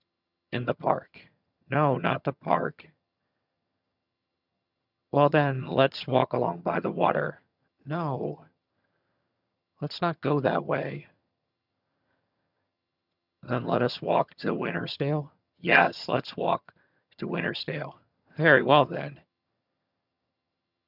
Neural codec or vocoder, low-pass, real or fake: vocoder, 22.05 kHz, 80 mel bands, HiFi-GAN; 5.4 kHz; fake